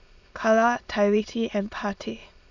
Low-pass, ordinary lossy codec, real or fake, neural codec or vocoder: 7.2 kHz; none; fake; autoencoder, 22.05 kHz, a latent of 192 numbers a frame, VITS, trained on many speakers